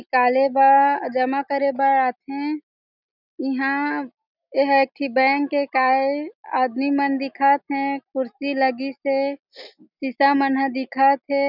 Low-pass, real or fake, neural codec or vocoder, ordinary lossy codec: 5.4 kHz; real; none; none